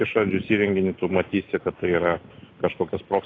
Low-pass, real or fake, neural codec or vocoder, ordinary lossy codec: 7.2 kHz; real; none; AAC, 32 kbps